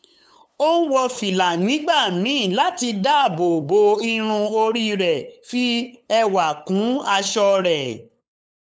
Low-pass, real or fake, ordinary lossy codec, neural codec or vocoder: none; fake; none; codec, 16 kHz, 8 kbps, FunCodec, trained on LibriTTS, 25 frames a second